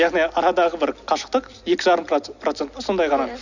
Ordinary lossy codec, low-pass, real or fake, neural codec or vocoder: none; 7.2 kHz; real; none